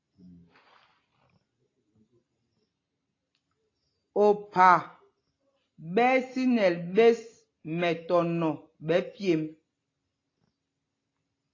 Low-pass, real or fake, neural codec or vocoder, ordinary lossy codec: 7.2 kHz; real; none; AAC, 32 kbps